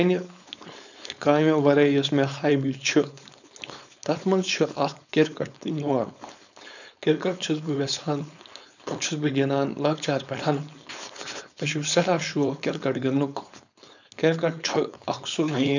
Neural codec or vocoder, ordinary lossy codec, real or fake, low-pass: codec, 16 kHz, 4.8 kbps, FACodec; none; fake; 7.2 kHz